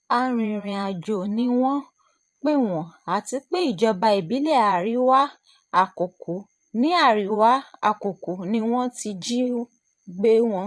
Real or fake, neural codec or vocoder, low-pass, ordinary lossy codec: fake; vocoder, 22.05 kHz, 80 mel bands, Vocos; none; none